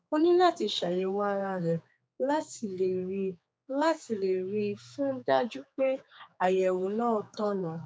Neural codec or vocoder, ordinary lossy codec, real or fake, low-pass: codec, 16 kHz, 4 kbps, X-Codec, HuBERT features, trained on general audio; none; fake; none